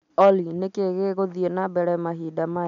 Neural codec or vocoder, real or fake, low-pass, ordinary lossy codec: none; real; 7.2 kHz; MP3, 64 kbps